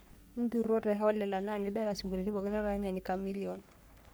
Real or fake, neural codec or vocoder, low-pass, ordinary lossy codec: fake; codec, 44.1 kHz, 3.4 kbps, Pupu-Codec; none; none